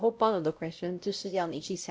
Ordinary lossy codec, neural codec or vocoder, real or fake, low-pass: none; codec, 16 kHz, 0.5 kbps, X-Codec, WavLM features, trained on Multilingual LibriSpeech; fake; none